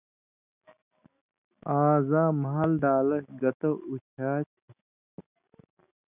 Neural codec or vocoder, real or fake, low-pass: none; real; 3.6 kHz